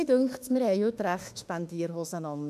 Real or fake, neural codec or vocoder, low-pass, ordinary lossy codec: fake; autoencoder, 48 kHz, 32 numbers a frame, DAC-VAE, trained on Japanese speech; 14.4 kHz; none